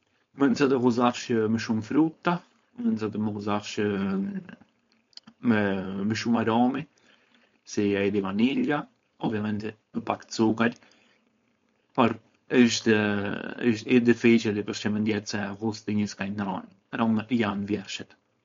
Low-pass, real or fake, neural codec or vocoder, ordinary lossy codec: 7.2 kHz; fake; codec, 16 kHz, 4.8 kbps, FACodec; MP3, 48 kbps